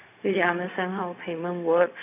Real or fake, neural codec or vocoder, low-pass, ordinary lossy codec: fake; codec, 16 kHz, 0.4 kbps, LongCat-Audio-Codec; 3.6 kHz; none